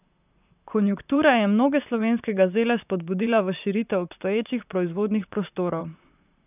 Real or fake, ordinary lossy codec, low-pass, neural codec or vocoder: fake; none; 3.6 kHz; vocoder, 44.1 kHz, 128 mel bands, Pupu-Vocoder